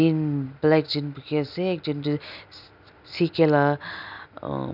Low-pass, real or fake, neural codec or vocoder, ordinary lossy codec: 5.4 kHz; real; none; none